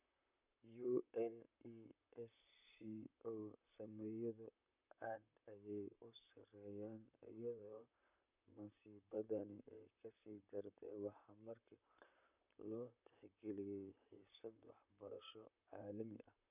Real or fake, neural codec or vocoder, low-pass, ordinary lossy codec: fake; vocoder, 44.1 kHz, 128 mel bands, Pupu-Vocoder; 3.6 kHz; none